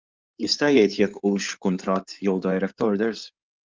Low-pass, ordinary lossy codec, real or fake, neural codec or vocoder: 7.2 kHz; Opus, 32 kbps; fake; codec, 16 kHz in and 24 kHz out, 2.2 kbps, FireRedTTS-2 codec